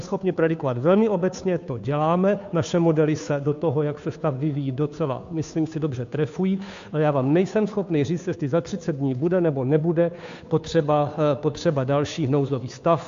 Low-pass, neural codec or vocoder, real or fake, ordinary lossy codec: 7.2 kHz; codec, 16 kHz, 2 kbps, FunCodec, trained on Chinese and English, 25 frames a second; fake; AAC, 64 kbps